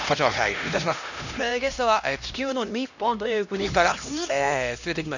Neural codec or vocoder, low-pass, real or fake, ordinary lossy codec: codec, 16 kHz, 1 kbps, X-Codec, HuBERT features, trained on LibriSpeech; 7.2 kHz; fake; none